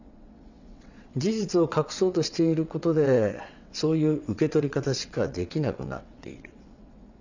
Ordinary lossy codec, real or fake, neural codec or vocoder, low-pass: none; fake; vocoder, 22.05 kHz, 80 mel bands, WaveNeXt; 7.2 kHz